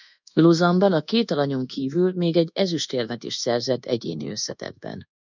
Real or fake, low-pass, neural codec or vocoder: fake; 7.2 kHz; codec, 24 kHz, 1.2 kbps, DualCodec